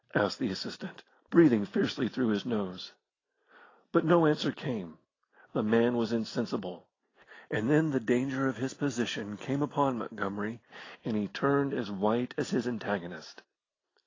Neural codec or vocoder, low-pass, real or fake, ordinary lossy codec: none; 7.2 kHz; real; AAC, 32 kbps